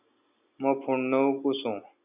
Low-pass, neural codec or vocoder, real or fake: 3.6 kHz; none; real